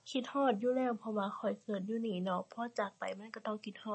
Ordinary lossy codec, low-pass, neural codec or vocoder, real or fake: MP3, 32 kbps; 9.9 kHz; none; real